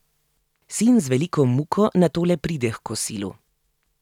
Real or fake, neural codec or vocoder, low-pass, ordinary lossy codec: real; none; 19.8 kHz; none